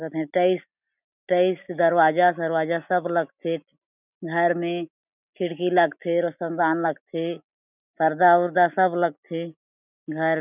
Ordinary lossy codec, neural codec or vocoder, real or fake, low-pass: none; none; real; 3.6 kHz